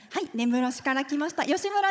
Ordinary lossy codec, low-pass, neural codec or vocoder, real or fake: none; none; codec, 16 kHz, 16 kbps, FunCodec, trained on Chinese and English, 50 frames a second; fake